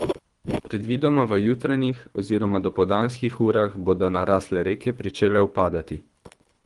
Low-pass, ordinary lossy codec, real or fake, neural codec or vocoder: 10.8 kHz; Opus, 24 kbps; fake; codec, 24 kHz, 3 kbps, HILCodec